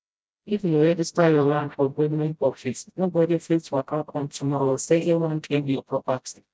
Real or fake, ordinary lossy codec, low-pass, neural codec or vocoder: fake; none; none; codec, 16 kHz, 0.5 kbps, FreqCodec, smaller model